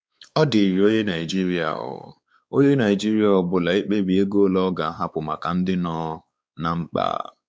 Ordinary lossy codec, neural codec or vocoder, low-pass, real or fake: none; codec, 16 kHz, 4 kbps, X-Codec, WavLM features, trained on Multilingual LibriSpeech; none; fake